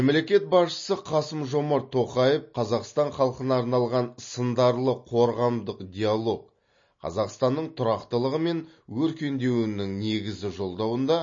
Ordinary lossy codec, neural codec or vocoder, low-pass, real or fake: MP3, 32 kbps; none; 7.2 kHz; real